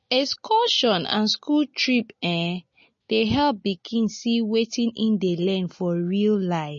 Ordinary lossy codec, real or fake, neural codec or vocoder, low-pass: MP3, 32 kbps; real; none; 7.2 kHz